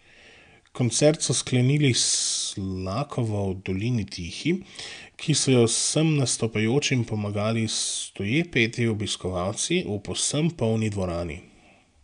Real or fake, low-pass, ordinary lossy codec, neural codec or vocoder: real; 9.9 kHz; none; none